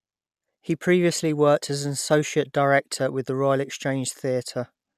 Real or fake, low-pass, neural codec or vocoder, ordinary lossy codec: real; 14.4 kHz; none; none